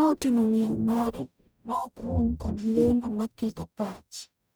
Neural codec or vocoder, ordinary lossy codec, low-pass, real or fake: codec, 44.1 kHz, 0.9 kbps, DAC; none; none; fake